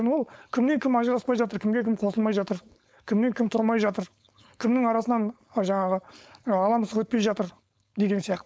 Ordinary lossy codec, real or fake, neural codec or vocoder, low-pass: none; fake; codec, 16 kHz, 4.8 kbps, FACodec; none